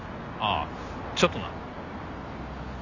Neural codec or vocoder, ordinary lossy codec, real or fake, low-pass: none; none; real; 7.2 kHz